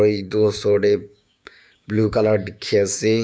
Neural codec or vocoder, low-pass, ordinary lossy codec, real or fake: codec, 16 kHz, 6 kbps, DAC; none; none; fake